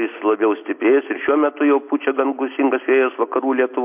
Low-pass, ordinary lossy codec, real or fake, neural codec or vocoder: 3.6 kHz; MP3, 32 kbps; real; none